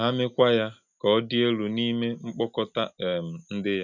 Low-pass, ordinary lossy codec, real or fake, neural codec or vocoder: 7.2 kHz; none; real; none